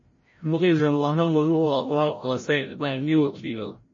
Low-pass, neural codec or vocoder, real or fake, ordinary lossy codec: 7.2 kHz; codec, 16 kHz, 0.5 kbps, FreqCodec, larger model; fake; MP3, 32 kbps